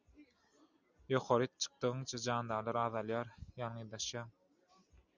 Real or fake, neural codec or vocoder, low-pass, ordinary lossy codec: real; none; 7.2 kHz; Opus, 64 kbps